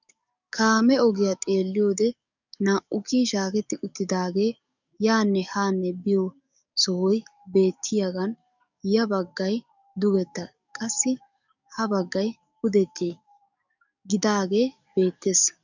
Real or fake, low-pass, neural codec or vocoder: fake; 7.2 kHz; codec, 44.1 kHz, 7.8 kbps, DAC